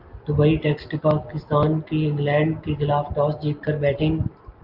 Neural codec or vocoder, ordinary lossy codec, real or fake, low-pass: none; Opus, 32 kbps; real; 5.4 kHz